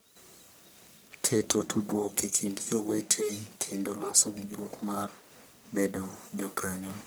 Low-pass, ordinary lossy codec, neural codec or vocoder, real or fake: none; none; codec, 44.1 kHz, 1.7 kbps, Pupu-Codec; fake